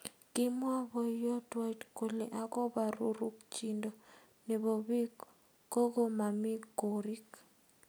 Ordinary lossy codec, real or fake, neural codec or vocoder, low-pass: none; real; none; none